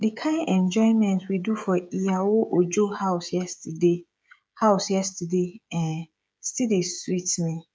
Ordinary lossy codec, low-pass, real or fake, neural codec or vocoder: none; none; fake; codec, 16 kHz, 16 kbps, FreqCodec, smaller model